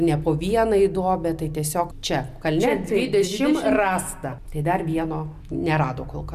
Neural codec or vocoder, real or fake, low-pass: none; real; 14.4 kHz